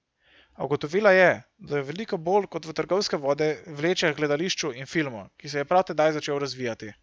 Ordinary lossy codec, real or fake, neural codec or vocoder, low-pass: none; real; none; none